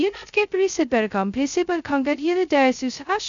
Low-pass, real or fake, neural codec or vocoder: 7.2 kHz; fake; codec, 16 kHz, 0.2 kbps, FocalCodec